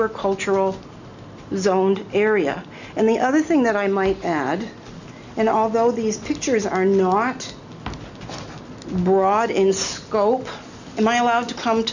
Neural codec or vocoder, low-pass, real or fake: none; 7.2 kHz; real